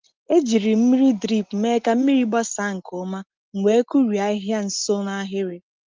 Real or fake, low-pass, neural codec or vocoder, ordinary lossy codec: real; 7.2 kHz; none; Opus, 24 kbps